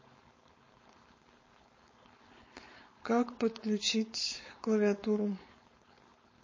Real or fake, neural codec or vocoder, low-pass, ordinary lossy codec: fake; codec, 16 kHz, 4.8 kbps, FACodec; 7.2 kHz; MP3, 32 kbps